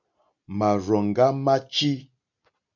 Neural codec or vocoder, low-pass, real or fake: none; 7.2 kHz; real